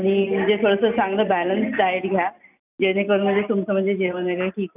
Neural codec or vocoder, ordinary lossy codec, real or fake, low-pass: none; none; real; 3.6 kHz